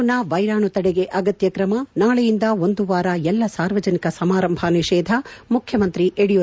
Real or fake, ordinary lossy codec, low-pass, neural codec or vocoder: real; none; none; none